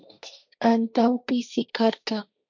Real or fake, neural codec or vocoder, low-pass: fake; codec, 16 kHz, 1.1 kbps, Voila-Tokenizer; 7.2 kHz